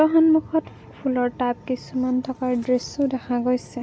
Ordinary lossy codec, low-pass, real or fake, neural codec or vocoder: none; none; real; none